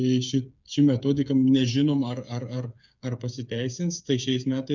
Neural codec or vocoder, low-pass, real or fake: codec, 16 kHz, 8 kbps, FreqCodec, smaller model; 7.2 kHz; fake